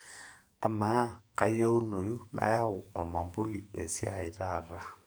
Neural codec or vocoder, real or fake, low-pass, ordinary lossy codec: codec, 44.1 kHz, 2.6 kbps, SNAC; fake; none; none